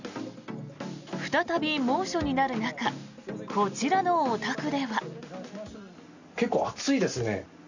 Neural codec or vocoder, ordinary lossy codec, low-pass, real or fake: none; none; 7.2 kHz; real